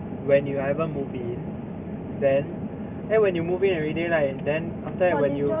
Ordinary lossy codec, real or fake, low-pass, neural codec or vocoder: none; real; 3.6 kHz; none